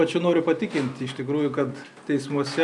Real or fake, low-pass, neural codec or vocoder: real; 10.8 kHz; none